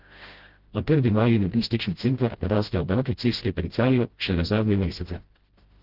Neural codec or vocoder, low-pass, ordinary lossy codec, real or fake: codec, 16 kHz, 0.5 kbps, FreqCodec, smaller model; 5.4 kHz; Opus, 16 kbps; fake